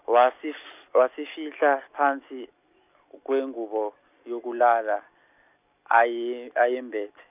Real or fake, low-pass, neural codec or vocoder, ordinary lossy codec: real; 3.6 kHz; none; none